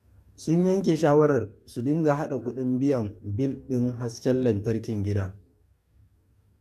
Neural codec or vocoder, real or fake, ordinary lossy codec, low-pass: codec, 44.1 kHz, 2.6 kbps, DAC; fake; none; 14.4 kHz